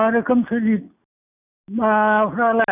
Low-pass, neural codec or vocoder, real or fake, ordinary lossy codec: 3.6 kHz; none; real; none